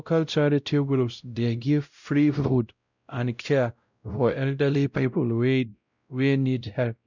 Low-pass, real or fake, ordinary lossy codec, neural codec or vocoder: 7.2 kHz; fake; none; codec, 16 kHz, 0.5 kbps, X-Codec, WavLM features, trained on Multilingual LibriSpeech